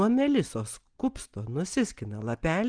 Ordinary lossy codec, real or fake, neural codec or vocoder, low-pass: Opus, 24 kbps; real; none; 9.9 kHz